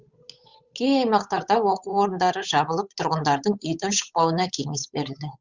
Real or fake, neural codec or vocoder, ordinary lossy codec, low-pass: fake; codec, 16 kHz, 8 kbps, FunCodec, trained on Chinese and English, 25 frames a second; Opus, 64 kbps; 7.2 kHz